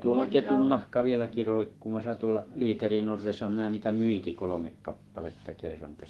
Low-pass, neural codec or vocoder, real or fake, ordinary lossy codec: 14.4 kHz; codec, 32 kHz, 1.9 kbps, SNAC; fake; Opus, 24 kbps